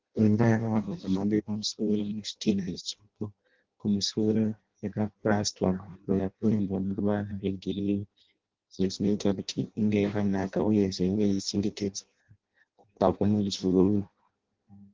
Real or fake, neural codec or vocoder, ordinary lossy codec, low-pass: fake; codec, 16 kHz in and 24 kHz out, 0.6 kbps, FireRedTTS-2 codec; Opus, 16 kbps; 7.2 kHz